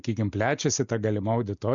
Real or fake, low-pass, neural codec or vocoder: real; 7.2 kHz; none